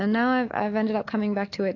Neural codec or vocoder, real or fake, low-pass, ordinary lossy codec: none; real; 7.2 kHz; AAC, 32 kbps